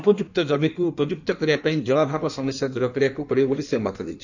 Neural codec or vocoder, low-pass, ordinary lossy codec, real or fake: codec, 16 kHz in and 24 kHz out, 1.1 kbps, FireRedTTS-2 codec; 7.2 kHz; none; fake